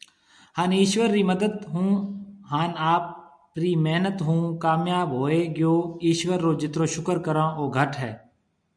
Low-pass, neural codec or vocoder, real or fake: 9.9 kHz; none; real